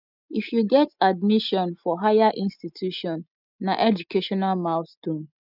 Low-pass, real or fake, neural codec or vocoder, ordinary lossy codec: 5.4 kHz; real; none; none